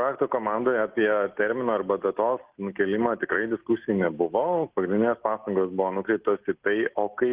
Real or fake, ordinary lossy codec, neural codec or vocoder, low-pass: real; Opus, 16 kbps; none; 3.6 kHz